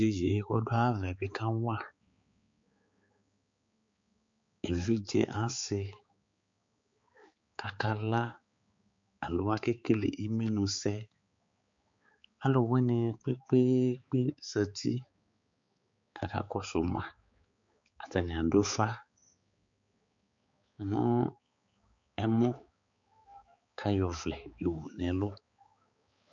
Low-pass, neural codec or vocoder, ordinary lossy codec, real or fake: 7.2 kHz; codec, 16 kHz, 4 kbps, X-Codec, HuBERT features, trained on balanced general audio; MP3, 48 kbps; fake